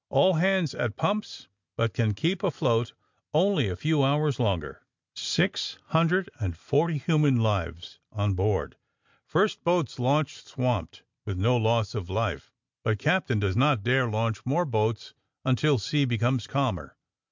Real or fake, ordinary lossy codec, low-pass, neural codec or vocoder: real; MP3, 64 kbps; 7.2 kHz; none